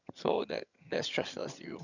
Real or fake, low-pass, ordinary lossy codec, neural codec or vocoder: fake; 7.2 kHz; none; vocoder, 22.05 kHz, 80 mel bands, HiFi-GAN